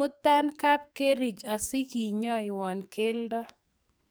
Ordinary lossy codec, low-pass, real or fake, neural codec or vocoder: none; none; fake; codec, 44.1 kHz, 2.6 kbps, SNAC